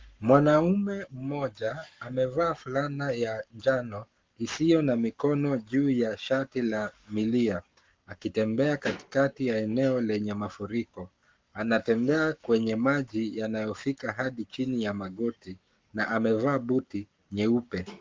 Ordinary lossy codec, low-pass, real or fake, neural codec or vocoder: Opus, 24 kbps; 7.2 kHz; fake; codec, 44.1 kHz, 7.8 kbps, Pupu-Codec